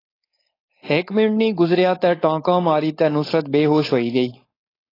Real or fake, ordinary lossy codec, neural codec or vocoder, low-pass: fake; AAC, 24 kbps; codec, 16 kHz, 4.8 kbps, FACodec; 5.4 kHz